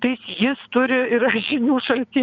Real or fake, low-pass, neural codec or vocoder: fake; 7.2 kHz; vocoder, 22.05 kHz, 80 mel bands, WaveNeXt